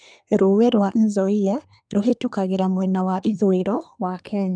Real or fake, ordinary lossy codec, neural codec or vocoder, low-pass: fake; none; codec, 24 kHz, 1 kbps, SNAC; 9.9 kHz